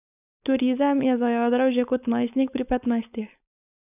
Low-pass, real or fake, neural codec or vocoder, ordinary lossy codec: 3.6 kHz; fake; codec, 16 kHz, 4.8 kbps, FACodec; none